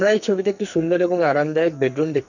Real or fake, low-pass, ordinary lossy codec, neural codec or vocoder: fake; 7.2 kHz; none; codec, 44.1 kHz, 2.6 kbps, SNAC